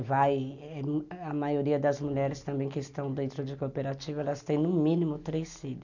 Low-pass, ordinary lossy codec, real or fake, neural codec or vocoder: 7.2 kHz; none; real; none